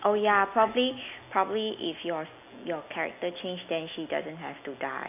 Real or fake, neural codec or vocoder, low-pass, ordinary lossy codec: real; none; 3.6 kHz; none